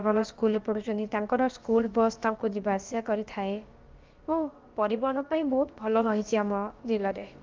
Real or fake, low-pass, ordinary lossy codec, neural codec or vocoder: fake; 7.2 kHz; Opus, 32 kbps; codec, 16 kHz, about 1 kbps, DyCAST, with the encoder's durations